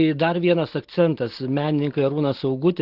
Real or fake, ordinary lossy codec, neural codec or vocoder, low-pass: real; Opus, 16 kbps; none; 5.4 kHz